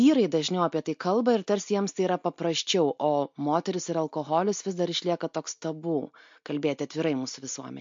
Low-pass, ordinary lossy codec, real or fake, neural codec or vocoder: 7.2 kHz; MP3, 48 kbps; real; none